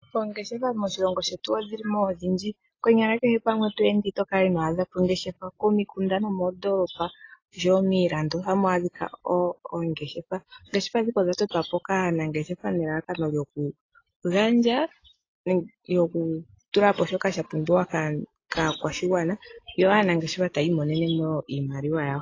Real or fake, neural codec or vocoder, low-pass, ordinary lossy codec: real; none; 7.2 kHz; AAC, 32 kbps